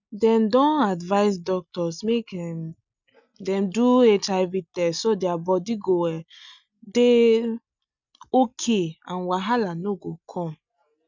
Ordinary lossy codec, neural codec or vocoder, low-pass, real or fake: none; none; 7.2 kHz; real